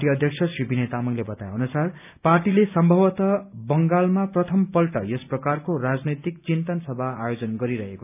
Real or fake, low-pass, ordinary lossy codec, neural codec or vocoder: real; 3.6 kHz; none; none